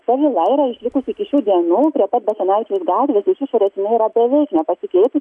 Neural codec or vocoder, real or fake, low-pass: none; real; 10.8 kHz